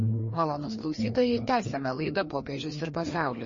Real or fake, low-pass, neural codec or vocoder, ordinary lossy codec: fake; 7.2 kHz; codec, 16 kHz, 2 kbps, FreqCodec, larger model; MP3, 32 kbps